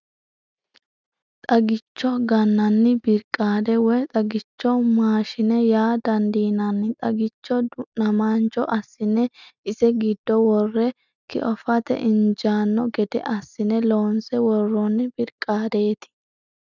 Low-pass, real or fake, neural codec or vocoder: 7.2 kHz; real; none